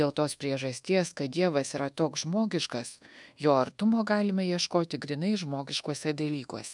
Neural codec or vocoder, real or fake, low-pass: codec, 24 kHz, 1.2 kbps, DualCodec; fake; 10.8 kHz